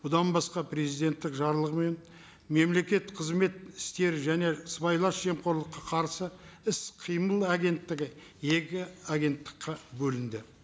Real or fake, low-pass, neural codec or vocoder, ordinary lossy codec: real; none; none; none